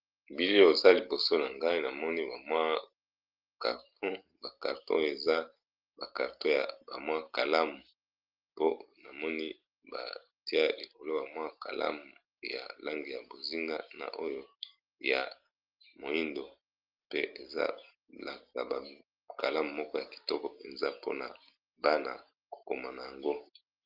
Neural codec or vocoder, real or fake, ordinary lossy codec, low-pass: none; real; Opus, 24 kbps; 5.4 kHz